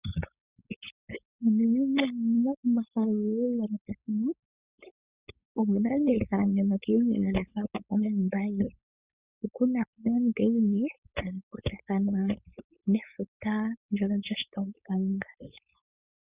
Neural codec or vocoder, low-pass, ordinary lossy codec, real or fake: codec, 16 kHz, 4.8 kbps, FACodec; 3.6 kHz; Opus, 64 kbps; fake